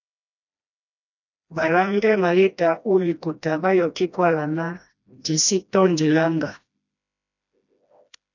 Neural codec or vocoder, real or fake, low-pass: codec, 16 kHz, 1 kbps, FreqCodec, smaller model; fake; 7.2 kHz